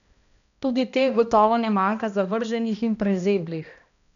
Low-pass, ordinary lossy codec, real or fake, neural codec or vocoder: 7.2 kHz; none; fake; codec, 16 kHz, 1 kbps, X-Codec, HuBERT features, trained on balanced general audio